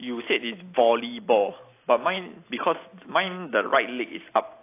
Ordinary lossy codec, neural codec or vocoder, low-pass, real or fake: AAC, 24 kbps; none; 3.6 kHz; real